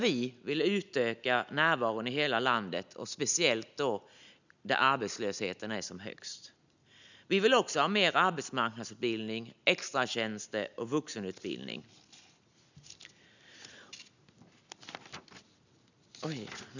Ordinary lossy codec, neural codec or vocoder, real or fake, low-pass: none; none; real; 7.2 kHz